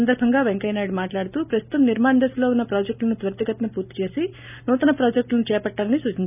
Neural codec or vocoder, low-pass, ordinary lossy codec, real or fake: none; 3.6 kHz; none; real